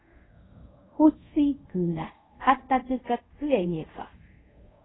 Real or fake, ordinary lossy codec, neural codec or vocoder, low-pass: fake; AAC, 16 kbps; codec, 24 kHz, 0.5 kbps, DualCodec; 7.2 kHz